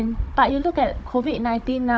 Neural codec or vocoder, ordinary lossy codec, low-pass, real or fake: codec, 16 kHz, 16 kbps, FunCodec, trained on Chinese and English, 50 frames a second; none; none; fake